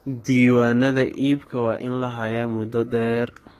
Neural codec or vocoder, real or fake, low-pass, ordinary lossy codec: codec, 44.1 kHz, 2.6 kbps, SNAC; fake; 14.4 kHz; AAC, 48 kbps